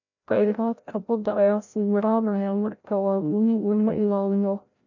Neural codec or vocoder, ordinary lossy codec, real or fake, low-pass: codec, 16 kHz, 0.5 kbps, FreqCodec, larger model; none; fake; 7.2 kHz